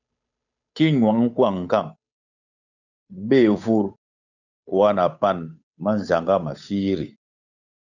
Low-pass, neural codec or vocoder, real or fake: 7.2 kHz; codec, 16 kHz, 8 kbps, FunCodec, trained on Chinese and English, 25 frames a second; fake